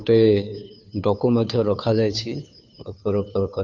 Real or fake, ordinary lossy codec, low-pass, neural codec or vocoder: fake; none; 7.2 kHz; codec, 16 kHz, 2 kbps, FunCodec, trained on Chinese and English, 25 frames a second